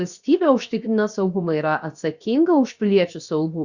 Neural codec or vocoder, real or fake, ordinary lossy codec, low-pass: codec, 16 kHz, 0.7 kbps, FocalCodec; fake; Opus, 64 kbps; 7.2 kHz